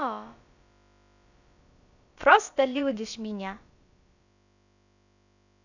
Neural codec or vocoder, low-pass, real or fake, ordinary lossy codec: codec, 16 kHz, about 1 kbps, DyCAST, with the encoder's durations; 7.2 kHz; fake; none